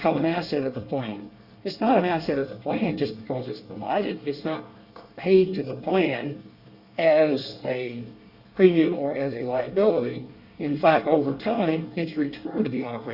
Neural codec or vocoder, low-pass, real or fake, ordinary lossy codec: codec, 24 kHz, 1 kbps, SNAC; 5.4 kHz; fake; Opus, 64 kbps